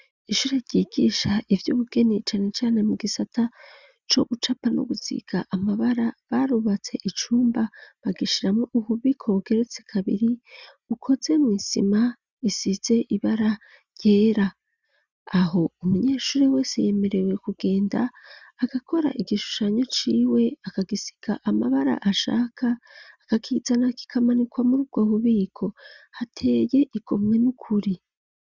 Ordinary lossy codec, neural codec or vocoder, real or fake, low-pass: Opus, 64 kbps; none; real; 7.2 kHz